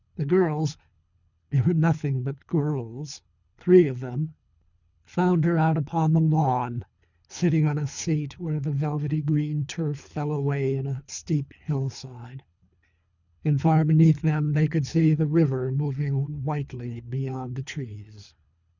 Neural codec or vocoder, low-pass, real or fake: codec, 24 kHz, 3 kbps, HILCodec; 7.2 kHz; fake